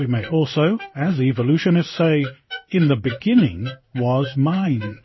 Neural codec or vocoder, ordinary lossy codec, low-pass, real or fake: codec, 16 kHz in and 24 kHz out, 1 kbps, XY-Tokenizer; MP3, 24 kbps; 7.2 kHz; fake